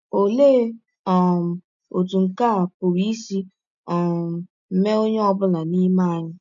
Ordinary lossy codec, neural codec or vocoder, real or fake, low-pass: none; none; real; 7.2 kHz